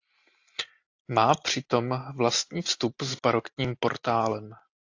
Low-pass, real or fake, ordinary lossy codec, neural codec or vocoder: 7.2 kHz; real; AAC, 48 kbps; none